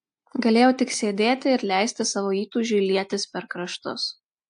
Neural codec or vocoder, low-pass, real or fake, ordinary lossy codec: none; 9.9 kHz; real; AAC, 64 kbps